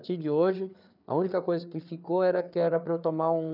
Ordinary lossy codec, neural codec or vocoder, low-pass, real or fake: none; codec, 16 kHz, 4 kbps, FreqCodec, larger model; 5.4 kHz; fake